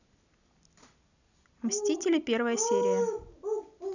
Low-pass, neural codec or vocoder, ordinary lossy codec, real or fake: 7.2 kHz; none; none; real